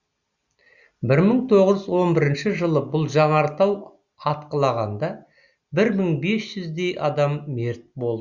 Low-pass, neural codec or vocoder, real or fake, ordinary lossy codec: 7.2 kHz; none; real; Opus, 64 kbps